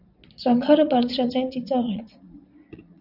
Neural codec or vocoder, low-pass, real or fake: vocoder, 22.05 kHz, 80 mel bands, Vocos; 5.4 kHz; fake